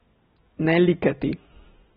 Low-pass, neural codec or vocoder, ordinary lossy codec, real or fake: 19.8 kHz; none; AAC, 16 kbps; real